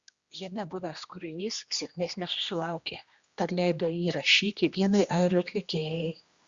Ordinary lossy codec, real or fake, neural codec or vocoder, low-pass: Opus, 64 kbps; fake; codec, 16 kHz, 1 kbps, X-Codec, HuBERT features, trained on general audio; 7.2 kHz